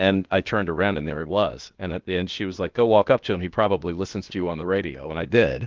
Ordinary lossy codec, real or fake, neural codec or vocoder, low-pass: Opus, 32 kbps; fake; codec, 16 kHz, 0.8 kbps, ZipCodec; 7.2 kHz